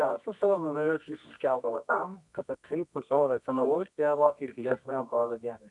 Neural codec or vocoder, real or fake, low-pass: codec, 24 kHz, 0.9 kbps, WavTokenizer, medium music audio release; fake; 10.8 kHz